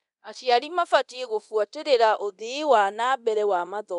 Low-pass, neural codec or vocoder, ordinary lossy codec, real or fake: 10.8 kHz; codec, 24 kHz, 0.9 kbps, DualCodec; none; fake